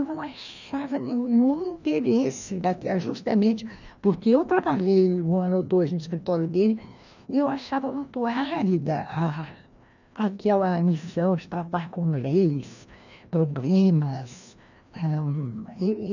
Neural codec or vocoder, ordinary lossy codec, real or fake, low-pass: codec, 16 kHz, 1 kbps, FreqCodec, larger model; none; fake; 7.2 kHz